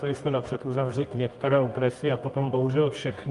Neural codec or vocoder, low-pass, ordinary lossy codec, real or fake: codec, 24 kHz, 0.9 kbps, WavTokenizer, medium music audio release; 10.8 kHz; Opus, 32 kbps; fake